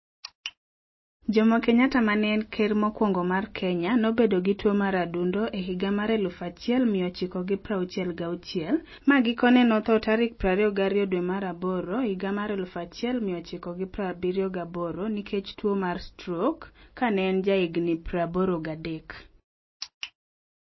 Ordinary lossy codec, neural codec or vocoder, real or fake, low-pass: MP3, 24 kbps; none; real; 7.2 kHz